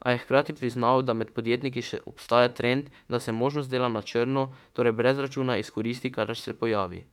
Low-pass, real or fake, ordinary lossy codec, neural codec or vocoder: 19.8 kHz; fake; MP3, 96 kbps; autoencoder, 48 kHz, 32 numbers a frame, DAC-VAE, trained on Japanese speech